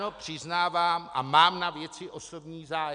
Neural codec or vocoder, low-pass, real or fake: none; 9.9 kHz; real